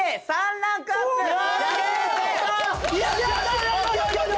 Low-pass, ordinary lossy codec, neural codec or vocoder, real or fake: none; none; none; real